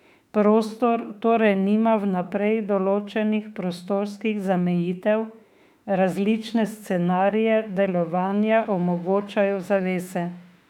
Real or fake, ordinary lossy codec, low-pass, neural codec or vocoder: fake; none; 19.8 kHz; autoencoder, 48 kHz, 32 numbers a frame, DAC-VAE, trained on Japanese speech